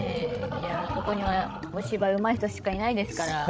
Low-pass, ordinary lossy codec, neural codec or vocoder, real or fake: none; none; codec, 16 kHz, 16 kbps, FreqCodec, larger model; fake